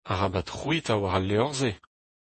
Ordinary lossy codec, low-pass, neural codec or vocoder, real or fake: MP3, 32 kbps; 10.8 kHz; vocoder, 48 kHz, 128 mel bands, Vocos; fake